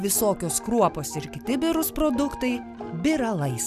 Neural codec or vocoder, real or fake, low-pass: none; real; 14.4 kHz